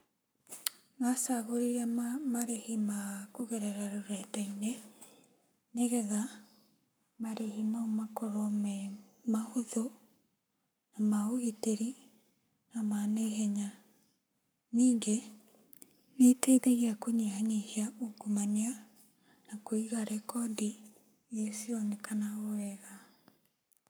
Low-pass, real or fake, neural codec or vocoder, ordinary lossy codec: none; fake; codec, 44.1 kHz, 7.8 kbps, Pupu-Codec; none